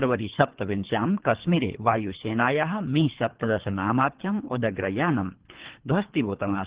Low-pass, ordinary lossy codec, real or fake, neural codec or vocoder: 3.6 kHz; Opus, 16 kbps; fake; codec, 24 kHz, 3 kbps, HILCodec